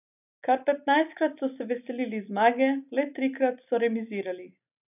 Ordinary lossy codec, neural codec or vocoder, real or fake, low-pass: none; none; real; 3.6 kHz